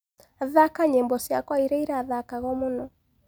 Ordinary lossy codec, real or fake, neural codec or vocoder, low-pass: none; real; none; none